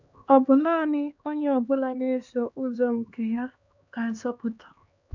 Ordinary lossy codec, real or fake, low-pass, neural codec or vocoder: none; fake; 7.2 kHz; codec, 16 kHz, 2 kbps, X-Codec, HuBERT features, trained on LibriSpeech